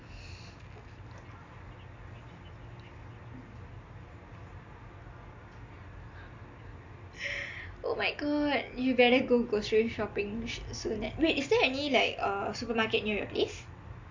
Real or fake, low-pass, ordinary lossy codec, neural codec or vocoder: real; 7.2 kHz; MP3, 48 kbps; none